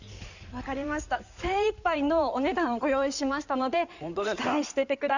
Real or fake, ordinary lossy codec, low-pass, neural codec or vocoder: fake; none; 7.2 kHz; codec, 16 kHz in and 24 kHz out, 2.2 kbps, FireRedTTS-2 codec